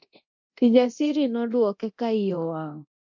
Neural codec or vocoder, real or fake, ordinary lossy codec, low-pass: codec, 24 kHz, 0.9 kbps, DualCodec; fake; MP3, 48 kbps; 7.2 kHz